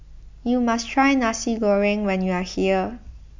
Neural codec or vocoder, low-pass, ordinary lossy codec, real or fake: none; 7.2 kHz; MP3, 64 kbps; real